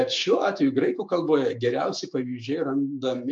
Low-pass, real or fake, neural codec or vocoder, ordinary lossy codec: 10.8 kHz; fake; autoencoder, 48 kHz, 128 numbers a frame, DAC-VAE, trained on Japanese speech; MP3, 64 kbps